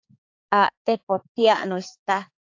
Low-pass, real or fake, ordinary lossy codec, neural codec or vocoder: 7.2 kHz; fake; AAC, 48 kbps; codec, 16 kHz, 4 kbps, X-Codec, HuBERT features, trained on balanced general audio